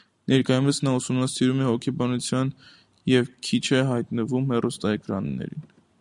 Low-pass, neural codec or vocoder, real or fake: 10.8 kHz; none; real